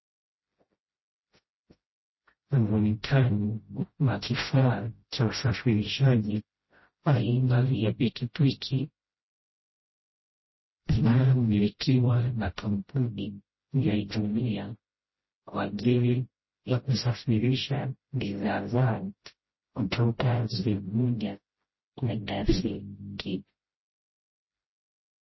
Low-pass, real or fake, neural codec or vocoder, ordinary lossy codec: 7.2 kHz; fake; codec, 16 kHz, 0.5 kbps, FreqCodec, smaller model; MP3, 24 kbps